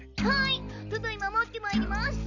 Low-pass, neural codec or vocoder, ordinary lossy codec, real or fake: 7.2 kHz; none; none; real